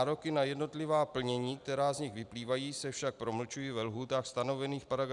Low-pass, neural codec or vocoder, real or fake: 10.8 kHz; none; real